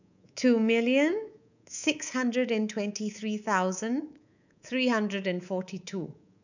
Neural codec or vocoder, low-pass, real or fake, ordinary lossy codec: codec, 24 kHz, 3.1 kbps, DualCodec; 7.2 kHz; fake; none